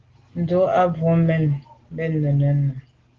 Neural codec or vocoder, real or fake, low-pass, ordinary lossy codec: none; real; 7.2 kHz; Opus, 16 kbps